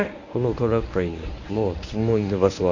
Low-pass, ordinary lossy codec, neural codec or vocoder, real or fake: 7.2 kHz; none; codec, 24 kHz, 0.9 kbps, WavTokenizer, medium speech release version 1; fake